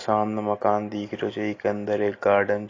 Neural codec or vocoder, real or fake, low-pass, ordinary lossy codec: none; real; 7.2 kHz; AAC, 32 kbps